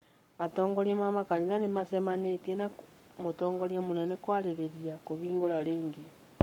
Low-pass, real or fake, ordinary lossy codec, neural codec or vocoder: 19.8 kHz; fake; MP3, 96 kbps; codec, 44.1 kHz, 7.8 kbps, Pupu-Codec